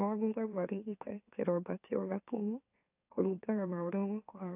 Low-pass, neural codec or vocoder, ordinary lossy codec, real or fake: 3.6 kHz; autoencoder, 44.1 kHz, a latent of 192 numbers a frame, MeloTTS; AAC, 32 kbps; fake